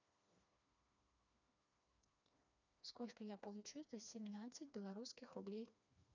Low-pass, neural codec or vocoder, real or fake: 7.2 kHz; codec, 16 kHz, 2 kbps, FreqCodec, smaller model; fake